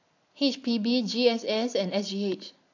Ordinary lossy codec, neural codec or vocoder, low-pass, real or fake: AAC, 48 kbps; none; 7.2 kHz; real